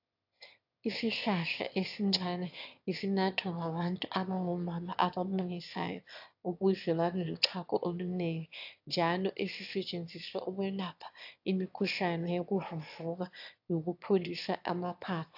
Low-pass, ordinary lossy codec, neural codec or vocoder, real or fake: 5.4 kHz; AAC, 48 kbps; autoencoder, 22.05 kHz, a latent of 192 numbers a frame, VITS, trained on one speaker; fake